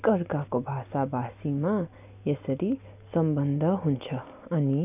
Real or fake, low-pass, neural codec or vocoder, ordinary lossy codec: real; 3.6 kHz; none; none